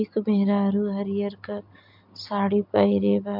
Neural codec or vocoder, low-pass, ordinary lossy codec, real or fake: none; 5.4 kHz; MP3, 48 kbps; real